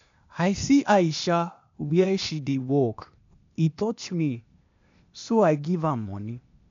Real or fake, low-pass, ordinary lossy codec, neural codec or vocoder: fake; 7.2 kHz; MP3, 64 kbps; codec, 16 kHz, 0.8 kbps, ZipCodec